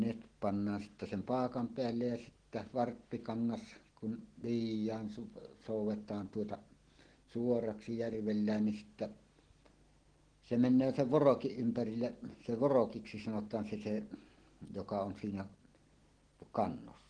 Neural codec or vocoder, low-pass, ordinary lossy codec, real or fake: none; 9.9 kHz; Opus, 16 kbps; real